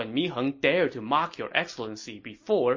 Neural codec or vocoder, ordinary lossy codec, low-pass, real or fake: none; MP3, 32 kbps; 7.2 kHz; real